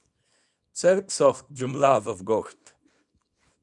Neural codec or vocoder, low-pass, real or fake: codec, 24 kHz, 0.9 kbps, WavTokenizer, small release; 10.8 kHz; fake